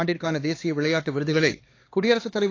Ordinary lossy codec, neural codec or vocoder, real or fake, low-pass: AAC, 32 kbps; codec, 16 kHz, 2 kbps, X-Codec, HuBERT features, trained on LibriSpeech; fake; 7.2 kHz